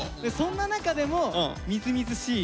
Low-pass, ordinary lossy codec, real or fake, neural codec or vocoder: none; none; real; none